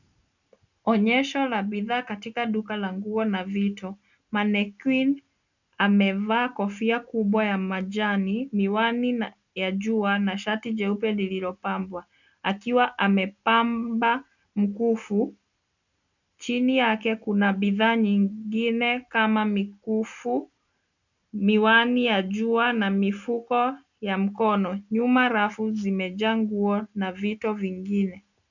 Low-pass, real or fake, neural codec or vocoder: 7.2 kHz; real; none